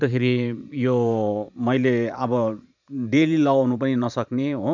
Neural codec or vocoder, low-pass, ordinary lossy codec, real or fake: vocoder, 44.1 kHz, 128 mel bands every 512 samples, BigVGAN v2; 7.2 kHz; none; fake